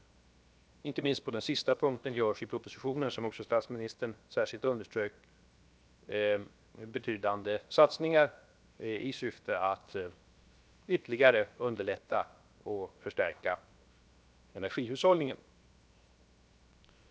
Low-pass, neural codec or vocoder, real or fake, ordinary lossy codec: none; codec, 16 kHz, 0.7 kbps, FocalCodec; fake; none